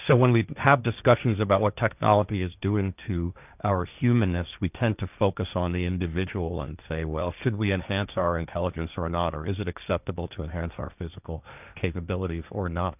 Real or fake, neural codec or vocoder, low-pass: fake; codec, 16 kHz, 1.1 kbps, Voila-Tokenizer; 3.6 kHz